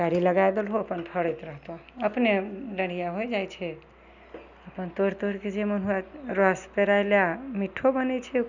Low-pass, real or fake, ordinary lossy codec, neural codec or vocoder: 7.2 kHz; real; none; none